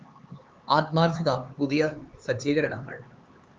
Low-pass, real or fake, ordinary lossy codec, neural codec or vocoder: 7.2 kHz; fake; Opus, 32 kbps; codec, 16 kHz, 4 kbps, X-Codec, HuBERT features, trained on LibriSpeech